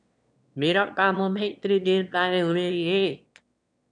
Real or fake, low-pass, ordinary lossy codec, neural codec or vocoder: fake; 9.9 kHz; MP3, 96 kbps; autoencoder, 22.05 kHz, a latent of 192 numbers a frame, VITS, trained on one speaker